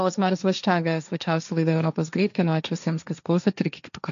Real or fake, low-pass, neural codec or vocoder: fake; 7.2 kHz; codec, 16 kHz, 1.1 kbps, Voila-Tokenizer